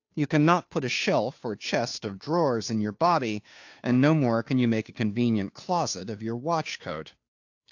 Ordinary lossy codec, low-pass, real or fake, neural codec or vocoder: AAC, 48 kbps; 7.2 kHz; fake; codec, 16 kHz, 2 kbps, FunCodec, trained on Chinese and English, 25 frames a second